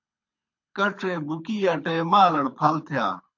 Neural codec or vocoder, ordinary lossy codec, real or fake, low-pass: codec, 24 kHz, 6 kbps, HILCodec; MP3, 48 kbps; fake; 7.2 kHz